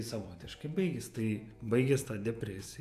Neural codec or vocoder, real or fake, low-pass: vocoder, 48 kHz, 128 mel bands, Vocos; fake; 14.4 kHz